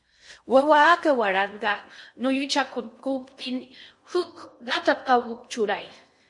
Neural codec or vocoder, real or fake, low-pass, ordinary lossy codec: codec, 16 kHz in and 24 kHz out, 0.6 kbps, FocalCodec, streaming, 4096 codes; fake; 10.8 kHz; MP3, 48 kbps